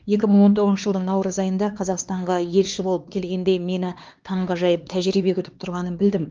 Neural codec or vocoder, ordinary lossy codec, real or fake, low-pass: codec, 16 kHz, 2 kbps, X-Codec, HuBERT features, trained on LibriSpeech; Opus, 24 kbps; fake; 7.2 kHz